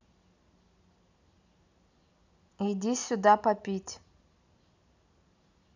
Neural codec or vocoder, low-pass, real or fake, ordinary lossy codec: none; 7.2 kHz; real; none